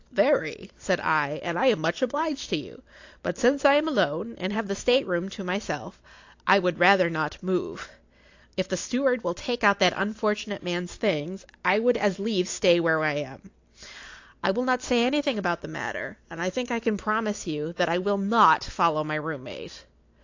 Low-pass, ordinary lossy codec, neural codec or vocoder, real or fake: 7.2 kHz; AAC, 48 kbps; none; real